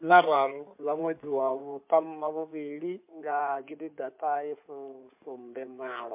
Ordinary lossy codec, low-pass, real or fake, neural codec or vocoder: MP3, 32 kbps; 3.6 kHz; fake; codec, 16 kHz in and 24 kHz out, 2.2 kbps, FireRedTTS-2 codec